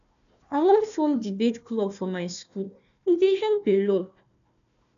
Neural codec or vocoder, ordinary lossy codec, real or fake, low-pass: codec, 16 kHz, 1 kbps, FunCodec, trained on Chinese and English, 50 frames a second; none; fake; 7.2 kHz